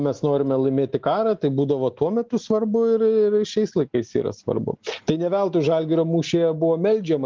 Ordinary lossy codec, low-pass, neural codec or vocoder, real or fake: Opus, 32 kbps; 7.2 kHz; none; real